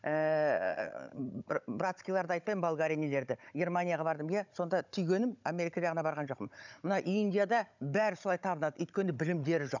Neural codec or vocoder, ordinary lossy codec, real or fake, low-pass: codec, 16 kHz, 16 kbps, FunCodec, trained on Chinese and English, 50 frames a second; none; fake; 7.2 kHz